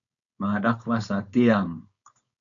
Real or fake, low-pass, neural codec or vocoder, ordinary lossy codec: fake; 7.2 kHz; codec, 16 kHz, 4.8 kbps, FACodec; MP3, 64 kbps